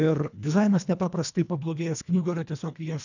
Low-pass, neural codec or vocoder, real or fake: 7.2 kHz; codec, 24 kHz, 1.5 kbps, HILCodec; fake